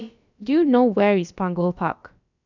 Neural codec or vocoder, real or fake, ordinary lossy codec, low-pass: codec, 16 kHz, about 1 kbps, DyCAST, with the encoder's durations; fake; none; 7.2 kHz